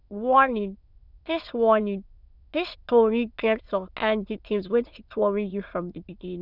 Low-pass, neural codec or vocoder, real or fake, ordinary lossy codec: 5.4 kHz; autoencoder, 22.05 kHz, a latent of 192 numbers a frame, VITS, trained on many speakers; fake; none